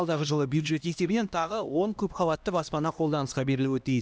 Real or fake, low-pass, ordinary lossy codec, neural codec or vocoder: fake; none; none; codec, 16 kHz, 1 kbps, X-Codec, HuBERT features, trained on LibriSpeech